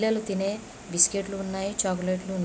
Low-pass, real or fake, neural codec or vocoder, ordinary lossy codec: none; real; none; none